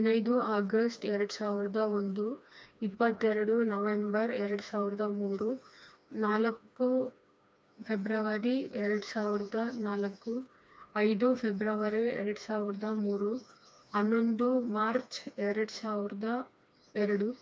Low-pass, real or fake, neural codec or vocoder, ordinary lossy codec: none; fake; codec, 16 kHz, 2 kbps, FreqCodec, smaller model; none